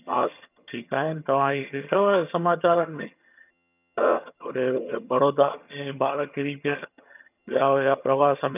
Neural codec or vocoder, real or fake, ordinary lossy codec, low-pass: vocoder, 22.05 kHz, 80 mel bands, HiFi-GAN; fake; none; 3.6 kHz